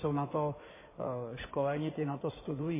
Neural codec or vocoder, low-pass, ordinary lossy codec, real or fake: codec, 16 kHz in and 24 kHz out, 2.2 kbps, FireRedTTS-2 codec; 3.6 kHz; MP3, 16 kbps; fake